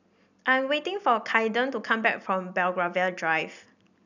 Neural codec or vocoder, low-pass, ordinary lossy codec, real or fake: none; 7.2 kHz; none; real